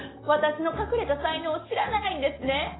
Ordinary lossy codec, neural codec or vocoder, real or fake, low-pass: AAC, 16 kbps; none; real; 7.2 kHz